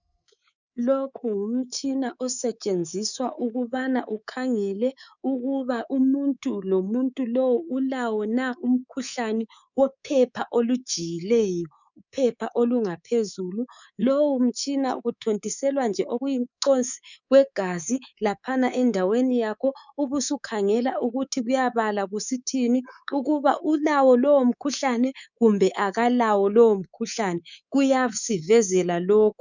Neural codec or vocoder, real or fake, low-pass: codec, 24 kHz, 3.1 kbps, DualCodec; fake; 7.2 kHz